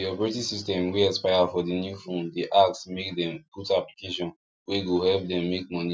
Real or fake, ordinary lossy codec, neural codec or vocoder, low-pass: real; none; none; none